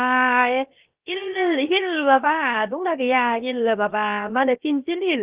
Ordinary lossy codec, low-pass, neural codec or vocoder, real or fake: Opus, 32 kbps; 3.6 kHz; codec, 16 kHz, 0.7 kbps, FocalCodec; fake